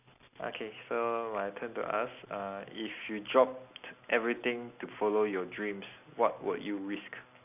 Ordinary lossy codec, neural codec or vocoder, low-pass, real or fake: none; none; 3.6 kHz; real